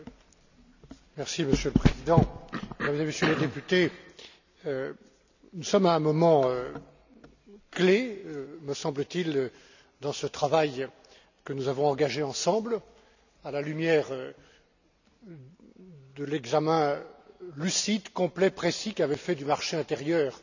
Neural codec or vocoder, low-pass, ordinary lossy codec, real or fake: none; 7.2 kHz; none; real